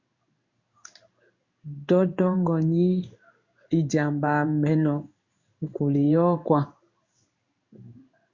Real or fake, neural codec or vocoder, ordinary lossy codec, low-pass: fake; codec, 16 kHz in and 24 kHz out, 1 kbps, XY-Tokenizer; Opus, 64 kbps; 7.2 kHz